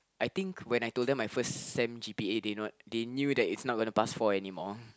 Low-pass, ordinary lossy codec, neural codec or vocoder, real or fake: none; none; none; real